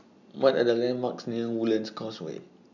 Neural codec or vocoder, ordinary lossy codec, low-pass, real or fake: none; none; 7.2 kHz; real